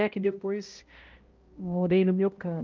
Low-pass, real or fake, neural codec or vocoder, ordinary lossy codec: 7.2 kHz; fake; codec, 16 kHz, 0.5 kbps, X-Codec, HuBERT features, trained on balanced general audio; Opus, 32 kbps